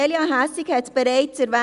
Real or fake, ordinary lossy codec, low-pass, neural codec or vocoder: real; Opus, 64 kbps; 10.8 kHz; none